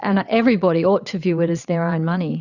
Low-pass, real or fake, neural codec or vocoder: 7.2 kHz; fake; vocoder, 22.05 kHz, 80 mel bands, WaveNeXt